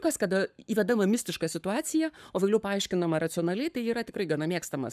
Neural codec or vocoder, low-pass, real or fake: codec, 44.1 kHz, 7.8 kbps, Pupu-Codec; 14.4 kHz; fake